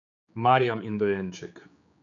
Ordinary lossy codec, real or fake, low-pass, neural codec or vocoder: none; fake; 7.2 kHz; codec, 16 kHz, 4 kbps, X-Codec, HuBERT features, trained on general audio